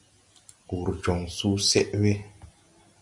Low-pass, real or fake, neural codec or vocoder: 10.8 kHz; real; none